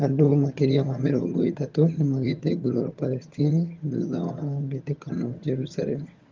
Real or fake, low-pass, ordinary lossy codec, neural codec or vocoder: fake; 7.2 kHz; Opus, 32 kbps; vocoder, 22.05 kHz, 80 mel bands, HiFi-GAN